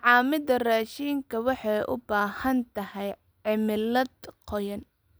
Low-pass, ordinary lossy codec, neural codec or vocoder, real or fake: none; none; none; real